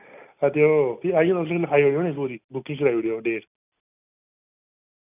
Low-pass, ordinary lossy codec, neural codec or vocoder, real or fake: 3.6 kHz; none; none; real